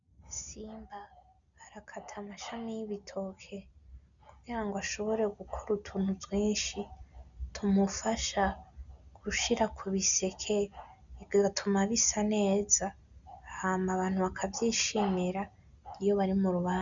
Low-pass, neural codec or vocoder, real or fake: 7.2 kHz; none; real